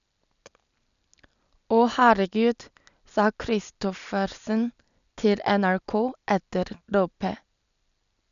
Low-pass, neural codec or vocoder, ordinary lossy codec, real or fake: 7.2 kHz; none; none; real